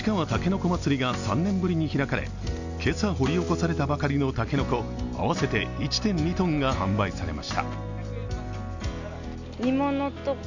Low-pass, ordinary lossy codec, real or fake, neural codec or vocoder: 7.2 kHz; none; real; none